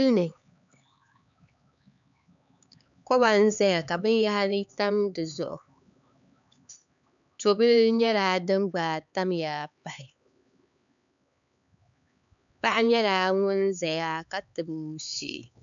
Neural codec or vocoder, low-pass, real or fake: codec, 16 kHz, 4 kbps, X-Codec, HuBERT features, trained on LibriSpeech; 7.2 kHz; fake